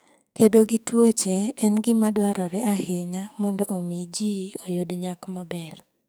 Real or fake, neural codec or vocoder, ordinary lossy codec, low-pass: fake; codec, 44.1 kHz, 2.6 kbps, SNAC; none; none